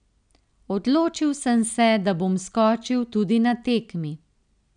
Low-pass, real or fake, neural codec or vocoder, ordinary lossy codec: 9.9 kHz; real; none; none